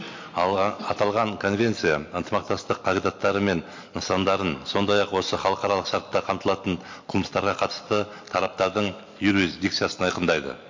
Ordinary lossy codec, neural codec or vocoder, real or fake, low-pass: MP3, 48 kbps; none; real; 7.2 kHz